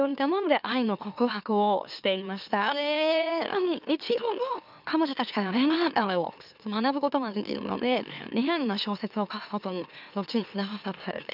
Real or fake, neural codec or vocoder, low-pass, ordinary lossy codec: fake; autoencoder, 44.1 kHz, a latent of 192 numbers a frame, MeloTTS; 5.4 kHz; none